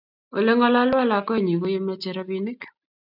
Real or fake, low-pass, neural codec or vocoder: real; 5.4 kHz; none